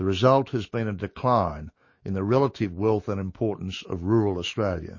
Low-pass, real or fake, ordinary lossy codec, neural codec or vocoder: 7.2 kHz; fake; MP3, 32 kbps; autoencoder, 48 kHz, 128 numbers a frame, DAC-VAE, trained on Japanese speech